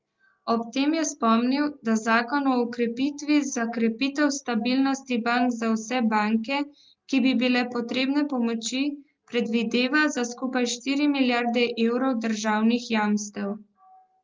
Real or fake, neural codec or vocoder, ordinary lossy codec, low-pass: real; none; Opus, 32 kbps; 7.2 kHz